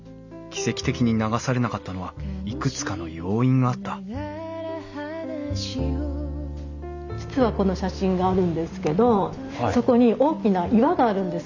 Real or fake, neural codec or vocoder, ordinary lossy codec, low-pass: real; none; none; 7.2 kHz